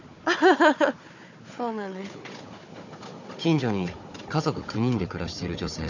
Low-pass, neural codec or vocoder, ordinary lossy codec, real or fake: 7.2 kHz; codec, 16 kHz, 4 kbps, FunCodec, trained on Chinese and English, 50 frames a second; none; fake